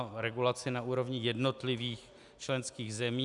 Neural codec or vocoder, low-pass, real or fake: none; 10.8 kHz; real